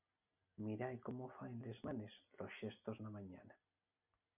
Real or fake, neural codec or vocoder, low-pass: real; none; 3.6 kHz